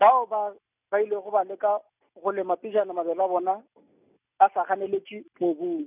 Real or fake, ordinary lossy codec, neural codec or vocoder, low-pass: real; none; none; 3.6 kHz